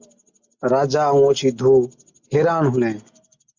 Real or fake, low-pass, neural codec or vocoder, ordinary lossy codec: real; 7.2 kHz; none; MP3, 64 kbps